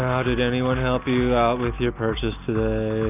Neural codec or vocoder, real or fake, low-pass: none; real; 3.6 kHz